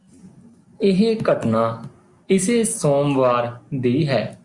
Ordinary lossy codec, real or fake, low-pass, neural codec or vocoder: Opus, 64 kbps; real; 10.8 kHz; none